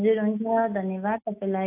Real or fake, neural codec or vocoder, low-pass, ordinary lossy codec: real; none; 3.6 kHz; AAC, 32 kbps